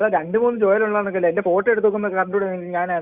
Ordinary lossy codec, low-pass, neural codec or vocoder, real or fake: Opus, 64 kbps; 3.6 kHz; none; real